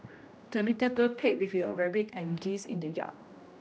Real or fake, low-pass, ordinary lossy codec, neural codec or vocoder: fake; none; none; codec, 16 kHz, 1 kbps, X-Codec, HuBERT features, trained on general audio